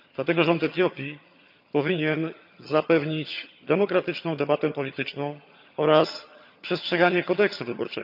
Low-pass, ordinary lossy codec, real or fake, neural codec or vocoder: 5.4 kHz; none; fake; vocoder, 22.05 kHz, 80 mel bands, HiFi-GAN